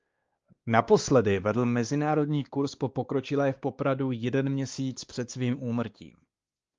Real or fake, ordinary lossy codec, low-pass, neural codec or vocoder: fake; Opus, 32 kbps; 7.2 kHz; codec, 16 kHz, 2 kbps, X-Codec, WavLM features, trained on Multilingual LibriSpeech